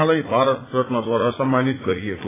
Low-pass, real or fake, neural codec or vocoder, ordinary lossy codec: 3.6 kHz; fake; vocoder, 44.1 kHz, 80 mel bands, Vocos; AAC, 16 kbps